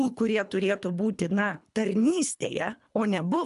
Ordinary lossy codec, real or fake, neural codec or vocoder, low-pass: MP3, 96 kbps; fake; codec, 24 kHz, 3 kbps, HILCodec; 10.8 kHz